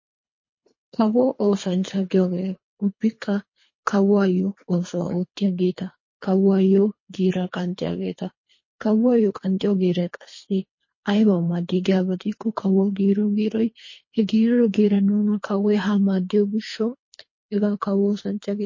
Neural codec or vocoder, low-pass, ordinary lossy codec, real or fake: codec, 24 kHz, 3 kbps, HILCodec; 7.2 kHz; MP3, 32 kbps; fake